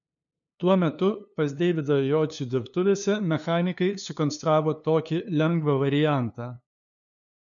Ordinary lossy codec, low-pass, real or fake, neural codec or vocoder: MP3, 96 kbps; 7.2 kHz; fake; codec, 16 kHz, 2 kbps, FunCodec, trained on LibriTTS, 25 frames a second